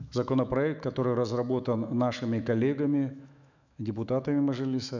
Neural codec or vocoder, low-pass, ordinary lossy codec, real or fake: autoencoder, 48 kHz, 128 numbers a frame, DAC-VAE, trained on Japanese speech; 7.2 kHz; none; fake